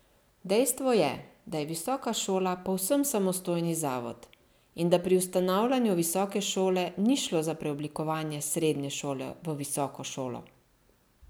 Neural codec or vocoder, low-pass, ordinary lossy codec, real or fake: none; none; none; real